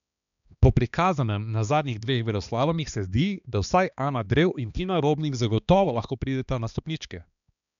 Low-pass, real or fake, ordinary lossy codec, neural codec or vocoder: 7.2 kHz; fake; none; codec, 16 kHz, 2 kbps, X-Codec, HuBERT features, trained on balanced general audio